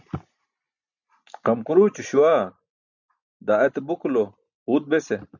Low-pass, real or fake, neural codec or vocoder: 7.2 kHz; real; none